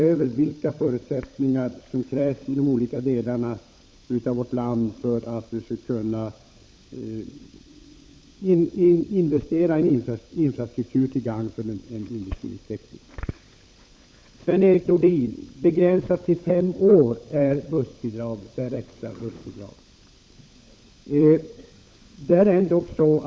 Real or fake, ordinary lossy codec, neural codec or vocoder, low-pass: fake; none; codec, 16 kHz, 16 kbps, FunCodec, trained on LibriTTS, 50 frames a second; none